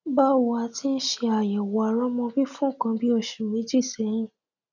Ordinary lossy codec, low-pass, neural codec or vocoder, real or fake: none; 7.2 kHz; none; real